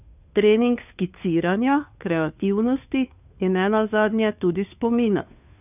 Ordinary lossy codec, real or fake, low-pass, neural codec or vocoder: none; fake; 3.6 kHz; codec, 16 kHz, 2 kbps, FunCodec, trained on Chinese and English, 25 frames a second